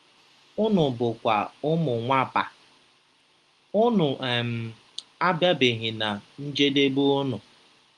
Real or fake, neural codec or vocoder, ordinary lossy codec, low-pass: real; none; Opus, 32 kbps; 10.8 kHz